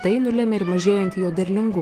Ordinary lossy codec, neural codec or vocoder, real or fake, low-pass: Opus, 24 kbps; autoencoder, 48 kHz, 128 numbers a frame, DAC-VAE, trained on Japanese speech; fake; 14.4 kHz